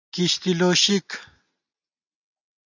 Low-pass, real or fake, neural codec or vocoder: 7.2 kHz; real; none